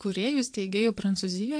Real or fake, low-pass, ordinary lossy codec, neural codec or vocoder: fake; 9.9 kHz; MP3, 64 kbps; vocoder, 22.05 kHz, 80 mel bands, Vocos